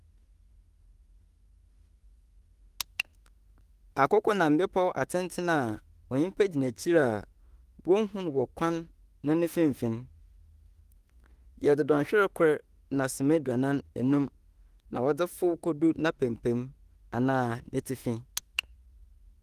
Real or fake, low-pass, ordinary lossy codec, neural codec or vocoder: fake; 14.4 kHz; Opus, 24 kbps; autoencoder, 48 kHz, 32 numbers a frame, DAC-VAE, trained on Japanese speech